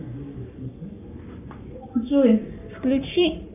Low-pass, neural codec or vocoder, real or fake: 3.6 kHz; codec, 44.1 kHz, 7.8 kbps, Pupu-Codec; fake